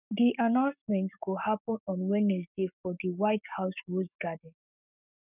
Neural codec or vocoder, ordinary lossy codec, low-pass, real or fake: codec, 44.1 kHz, 7.8 kbps, Pupu-Codec; none; 3.6 kHz; fake